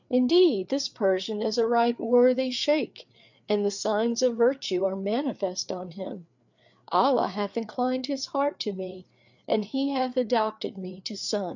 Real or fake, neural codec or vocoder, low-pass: fake; codec, 16 kHz, 4 kbps, FreqCodec, larger model; 7.2 kHz